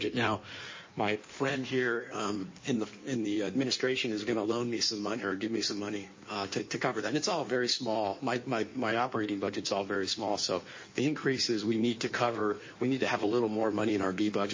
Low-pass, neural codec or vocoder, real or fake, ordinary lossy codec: 7.2 kHz; codec, 16 kHz in and 24 kHz out, 1.1 kbps, FireRedTTS-2 codec; fake; MP3, 32 kbps